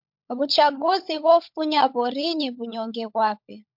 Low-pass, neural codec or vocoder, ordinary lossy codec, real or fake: 5.4 kHz; codec, 16 kHz, 16 kbps, FunCodec, trained on LibriTTS, 50 frames a second; MP3, 48 kbps; fake